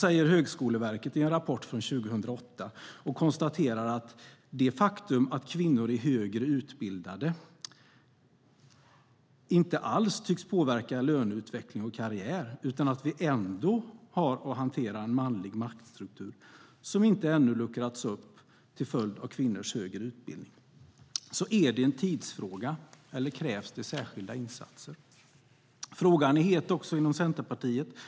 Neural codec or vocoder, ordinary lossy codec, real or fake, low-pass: none; none; real; none